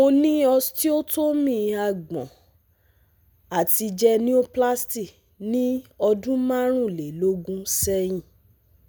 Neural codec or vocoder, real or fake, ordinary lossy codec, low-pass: none; real; none; none